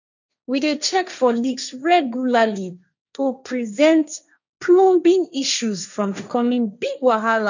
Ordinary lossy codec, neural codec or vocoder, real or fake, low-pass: none; codec, 16 kHz, 1.1 kbps, Voila-Tokenizer; fake; 7.2 kHz